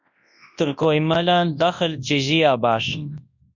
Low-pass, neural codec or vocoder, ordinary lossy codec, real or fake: 7.2 kHz; codec, 24 kHz, 0.9 kbps, WavTokenizer, large speech release; MP3, 64 kbps; fake